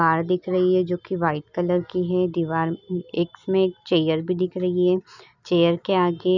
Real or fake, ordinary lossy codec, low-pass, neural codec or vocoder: real; none; 7.2 kHz; none